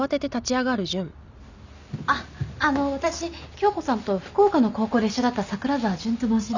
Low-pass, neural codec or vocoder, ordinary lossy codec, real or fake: 7.2 kHz; none; none; real